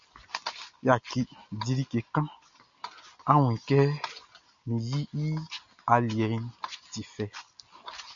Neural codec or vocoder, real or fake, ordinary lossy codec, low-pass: none; real; MP3, 64 kbps; 7.2 kHz